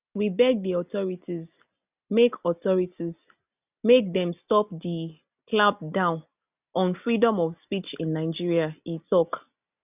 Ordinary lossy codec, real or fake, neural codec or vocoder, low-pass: none; real; none; 3.6 kHz